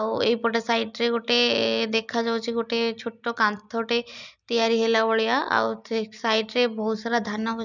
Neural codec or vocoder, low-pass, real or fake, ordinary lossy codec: none; 7.2 kHz; real; none